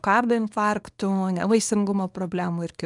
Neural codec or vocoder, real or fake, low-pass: codec, 24 kHz, 0.9 kbps, WavTokenizer, small release; fake; 10.8 kHz